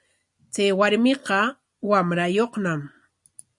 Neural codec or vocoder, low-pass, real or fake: none; 10.8 kHz; real